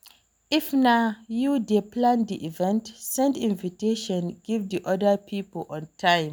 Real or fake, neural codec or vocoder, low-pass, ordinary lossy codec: real; none; none; none